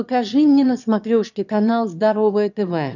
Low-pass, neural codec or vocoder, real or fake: 7.2 kHz; autoencoder, 22.05 kHz, a latent of 192 numbers a frame, VITS, trained on one speaker; fake